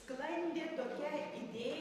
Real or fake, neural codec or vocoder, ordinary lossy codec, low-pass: fake; vocoder, 44.1 kHz, 128 mel bands every 512 samples, BigVGAN v2; AAC, 96 kbps; 14.4 kHz